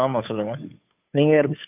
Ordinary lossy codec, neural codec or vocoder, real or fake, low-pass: AAC, 32 kbps; codec, 16 kHz, 2 kbps, FunCodec, trained on Chinese and English, 25 frames a second; fake; 3.6 kHz